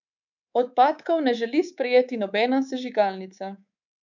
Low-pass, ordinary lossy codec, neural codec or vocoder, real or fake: 7.2 kHz; none; codec, 24 kHz, 3.1 kbps, DualCodec; fake